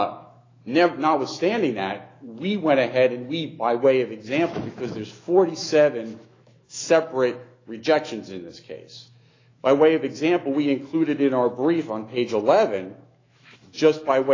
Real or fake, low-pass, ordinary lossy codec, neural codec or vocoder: fake; 7.2 kHz; AAC, 32 kbps; autoencoder, 48 kHz, 128 numbers a frame, DAC-VAE, trained on Japanese speech